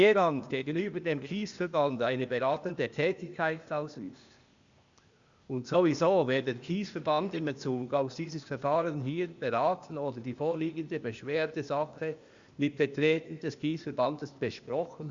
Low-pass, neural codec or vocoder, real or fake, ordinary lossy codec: 7.2 kHz; codec, 16 kHz, 0.8 kbps, ZipCodec; fake; Opus, 64 kbps